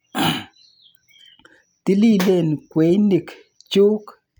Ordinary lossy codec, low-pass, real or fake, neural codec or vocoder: none; none; real; none